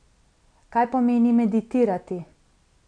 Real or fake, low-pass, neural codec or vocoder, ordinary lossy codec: real; 9.9 kHz; none; none